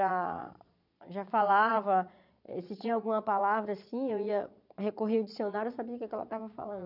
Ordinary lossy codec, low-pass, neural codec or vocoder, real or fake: none; 5.4 kHz; vocoder, 22.05 kHz, 80 mel bands, Vocos; fake